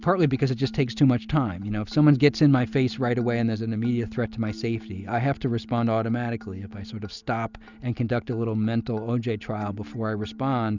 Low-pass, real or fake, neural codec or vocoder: 7.2 kHz; real; none